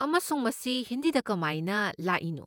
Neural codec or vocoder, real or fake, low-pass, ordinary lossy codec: vocoder, 48 kHz, 128 mel bands, Vocos; fake; none; none